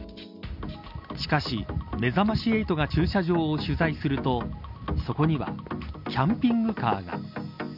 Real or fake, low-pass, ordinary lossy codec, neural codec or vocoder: real; 5.4 kHz; none; none